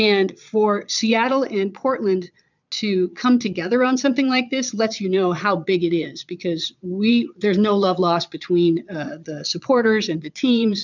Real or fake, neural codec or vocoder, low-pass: fake; vocoder, 22.05 kHz, 80 mel bands, Vocos; 7.2 kHz